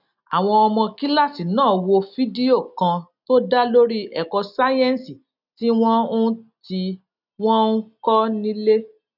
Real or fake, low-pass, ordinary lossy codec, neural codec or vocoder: real; 5.4 kHz; none; none